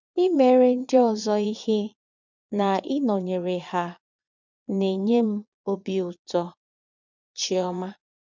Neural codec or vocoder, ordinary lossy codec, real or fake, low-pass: vocoder, 22.05 kHz, 80 mel bands, Vocos; none; fake; 7.2 kHz